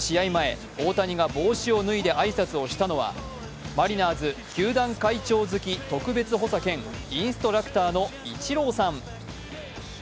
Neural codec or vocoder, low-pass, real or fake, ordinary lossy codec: none; none; real; none